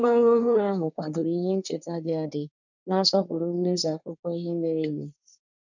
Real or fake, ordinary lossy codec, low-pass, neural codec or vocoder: fake; none; 7.2 kHz; codec, 24 kHz, 1 kbps, SNAC